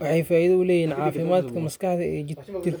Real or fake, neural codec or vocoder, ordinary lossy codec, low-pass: real; none; none; none